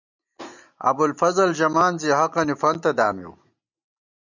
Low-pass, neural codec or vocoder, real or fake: 7.2 kHz; none; real